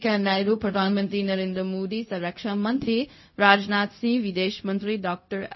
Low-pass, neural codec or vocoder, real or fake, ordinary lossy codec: 7.2 kHz; codec, 16 kHz, 0.4 kbps, LongCat-Audio-Codec; fake; MP3, 24 kbps